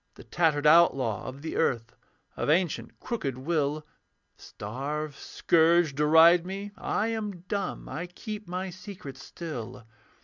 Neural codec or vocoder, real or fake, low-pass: none; real; 7.2 kHz